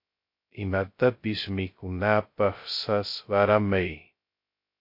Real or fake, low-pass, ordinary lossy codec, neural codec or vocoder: fake; 5.4 kHz; MP3, 32 kbps; codec, 16 kHz, 0.2 kbps, FocalCodec